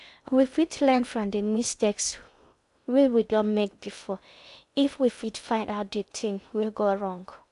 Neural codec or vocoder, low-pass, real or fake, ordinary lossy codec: codec, 16 kHz in and 24 kHz out, 0.6 kbps, FocalCodec, streaming, 2048 codes; 10.8 kHz; fake; none